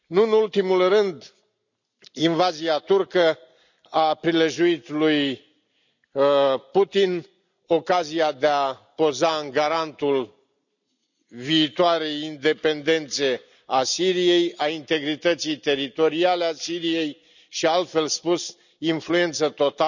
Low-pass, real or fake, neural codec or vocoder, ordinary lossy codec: 7.2 kHz; real; none; none